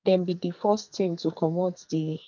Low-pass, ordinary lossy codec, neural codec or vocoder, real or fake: 7.2 kHz; none; codec, 44.1 kHz, 2.6 kbps, SNAC; fake